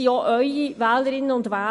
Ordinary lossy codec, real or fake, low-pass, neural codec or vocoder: MP3, 48 kbps; real; 14.4 kHz; none